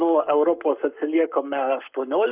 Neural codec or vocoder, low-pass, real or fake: codec, 44.1 kHz, 7.8 kbps, Pupu-Codec; 3.6 kHz; fake